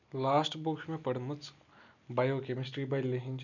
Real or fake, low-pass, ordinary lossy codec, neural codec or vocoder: real; 7.2 kHz; none; none